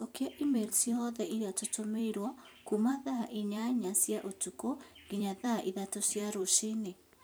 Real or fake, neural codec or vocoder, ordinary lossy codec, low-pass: fake; vocoder, 44.1 kHz, 128 mel bands every 256 samples, BigVGAN v2; none; none